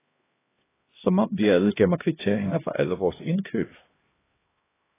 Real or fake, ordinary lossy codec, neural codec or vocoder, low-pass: fake; AAC, 16 kbps; codec, 16 kHz, 1 kbps, X-Codec, HuBERT features, trained on LibriSpeech; 3.6 kHz